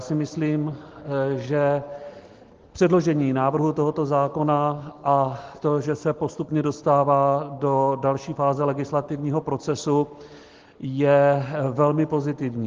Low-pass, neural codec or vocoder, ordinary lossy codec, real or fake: 7.2 kHz; none; Opus, 16 kbps; real